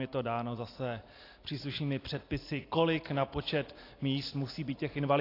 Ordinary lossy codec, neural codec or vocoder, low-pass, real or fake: AAC, 32 kbps; none; 5.4 kHz; real